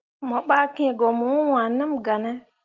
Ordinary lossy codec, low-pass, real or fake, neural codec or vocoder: Opus, 32 kbps; 7.2 kHz; real; none